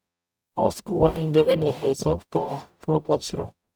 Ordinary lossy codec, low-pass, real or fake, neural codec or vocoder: none; none; fake; codec, 44.1 kHz, 0.9 kbps, DAC